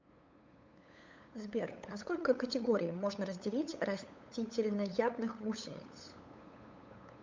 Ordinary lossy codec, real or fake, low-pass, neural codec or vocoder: MP3, 64 kbps; fake; 7.2 kHz; codec, 16 kHz, 8 kbps, FunCodec, trained on LibriTTS, 25 frames a second